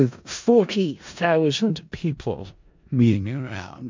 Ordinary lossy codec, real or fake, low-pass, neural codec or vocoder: MP3, 64 kbps; fake; 7.2 kHz; codec, 16 kHz in and 24 kHz out, 0.4 kbps, LongCat-Audio-Codec, four codebook decoder